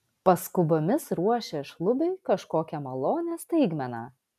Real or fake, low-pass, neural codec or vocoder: real; 14.4 kHz; none